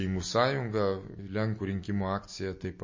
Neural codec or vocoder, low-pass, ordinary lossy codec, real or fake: none; 7.2 kHz; MP3, 32 kbps; real